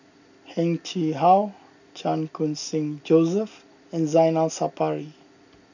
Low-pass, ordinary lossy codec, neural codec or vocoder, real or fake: 7.2 kHz; none; none; real